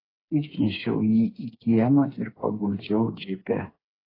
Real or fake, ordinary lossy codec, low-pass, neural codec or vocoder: fake; AAC, 24 kbps; 5.4 kHz; codec, 16 kHz, 4 kbps, FreqCodec, smaller model